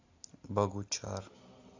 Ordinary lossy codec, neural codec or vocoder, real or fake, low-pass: AAC, 48 kbps; none; real; 7.2 kHz